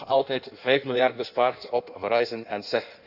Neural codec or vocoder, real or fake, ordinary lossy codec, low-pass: codec, 16 kHz in and 24 kHz out, 1.1 kbps, FireRedTTS-2 codec; fake; none; 5.4 kHz